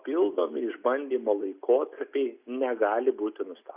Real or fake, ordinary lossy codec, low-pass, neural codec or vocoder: real; Opus, 64 kbps; 3.6 kHz; none